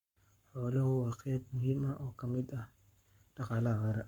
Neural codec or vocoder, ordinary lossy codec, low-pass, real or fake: codec, 44.1 kHz, 7.8 kbps, Pupu-Codec; Opus, 64 kbps; 19.8 kHz; fake